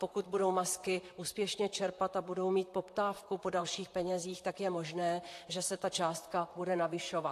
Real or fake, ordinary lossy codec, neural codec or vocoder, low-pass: fake; AAC, 64 kbps; vocoder, 44.1 kHz, 128 mel bands, Pupu-Vocoder; 14.4 kHz